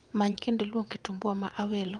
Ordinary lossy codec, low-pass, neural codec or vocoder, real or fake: Opus, 32 kbps; 9.9 kHz; vocoder, 22.05 kHz, 80 mel bands, WaveNeXt; fake